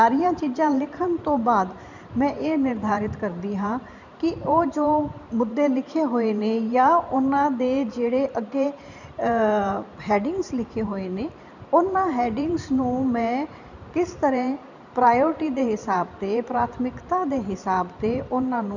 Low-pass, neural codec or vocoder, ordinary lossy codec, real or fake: 7.2 kHz; vocoder, 44.1 kHz, 128 mel bands every 512 samples, BigVGAN v2; none; fake